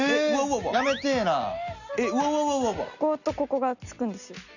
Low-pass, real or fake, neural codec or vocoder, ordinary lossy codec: 7.2 kHz; real; none; none